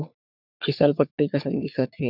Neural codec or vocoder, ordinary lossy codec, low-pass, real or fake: codec, 44.1 kHz, 3.4 kbps, Pupu-Codec; none; 5.4 kHz; fake